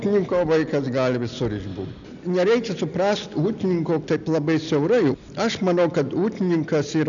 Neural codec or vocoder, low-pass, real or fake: none; 7.2 kHz; real